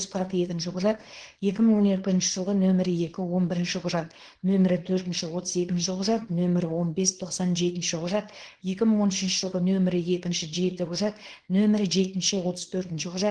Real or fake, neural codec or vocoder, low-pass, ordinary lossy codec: fake; codec, 24 kHz, 0.9 kbps, WavTokenizer, small release; 9.9 kHz; Opus, 16 kbps